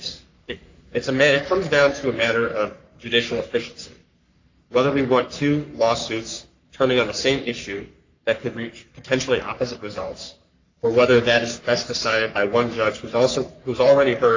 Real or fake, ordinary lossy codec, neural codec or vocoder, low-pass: fake; AAC, 48 kbps; codec, 44.1 kHz, 3.4 kbps, Pupu-Codec; 7.2 kHz